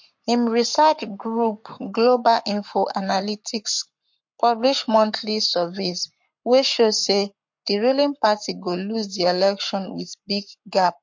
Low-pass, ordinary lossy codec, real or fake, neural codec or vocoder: 7.2 kHz; MP3, 48 kbps; fake; codec, 44.1 kHz, 7.8 kbps, Pupu-Codec